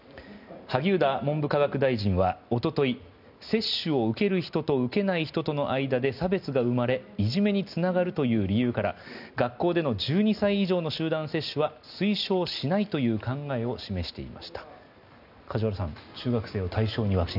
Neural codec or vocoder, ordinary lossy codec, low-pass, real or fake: none; none; 5.4 kHz; real